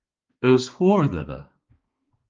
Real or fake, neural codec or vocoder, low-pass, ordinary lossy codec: fake; codec, 16 kHz, 2 kbps, X-Codec, HuBERT features, trained on balanced general audio; 7.2 kHz; Opus, 32 kbps